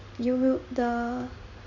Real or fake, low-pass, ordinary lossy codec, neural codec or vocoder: fake; 7.2 kHz; none; codec, 16 kHz in and 24 kHz out, 1 kbps, XY-Tokenizer